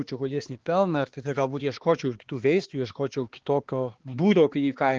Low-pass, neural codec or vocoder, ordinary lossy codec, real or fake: 7.2 kHz; codec, 16 kHz, 2 kbps, X-Codec, HuBERT features, trained on balanced general audio; Opus, 16 kbps; fake